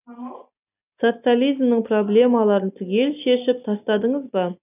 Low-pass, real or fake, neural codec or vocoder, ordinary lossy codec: 3.6 kHz; real; none; none